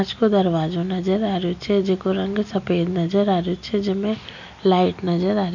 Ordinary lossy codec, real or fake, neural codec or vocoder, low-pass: none; real; none; 7.2 kHz